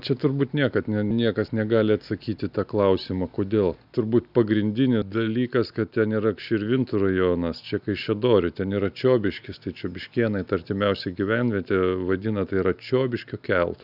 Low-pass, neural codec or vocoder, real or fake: 5.4 kHz; none; real